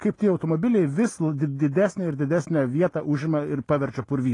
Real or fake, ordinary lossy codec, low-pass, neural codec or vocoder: real; AAC, 32 kbps; 10.8 kHz; none